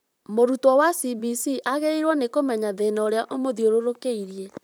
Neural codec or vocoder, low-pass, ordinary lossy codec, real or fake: vocoder, 44.1 kHz, 128 mel bands, Pupu-Vocoder; none; none; fake